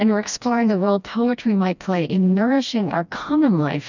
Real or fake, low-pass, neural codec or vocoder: fake; 7.2 kHz; codec, 16 kHz, 1 kbps, FreqCodec, smaller model